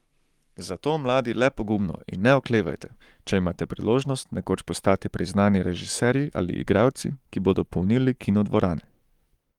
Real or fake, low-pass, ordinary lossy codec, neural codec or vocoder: fake; 19.8 kHz; Opus, 32 kbps; codec, 44.1 kHz, 7.8 kbps, Pupu-Codec